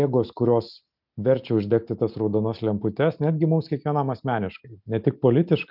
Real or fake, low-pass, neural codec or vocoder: real; 5.4 kHz; none